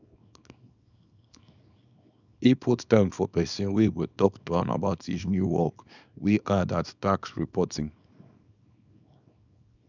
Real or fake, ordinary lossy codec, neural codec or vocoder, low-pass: fake; none; codec, 24 kHz, 0.9 kbps, WavTokenizer, small release; 7.2 kHz